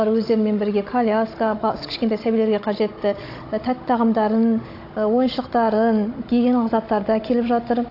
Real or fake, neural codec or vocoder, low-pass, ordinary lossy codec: fake; codec, 16 kHz, 16 kbps, FunCodec, trained on Chinese and English, 50 frames a second; 5.4 kHz; AAC, 32 kbps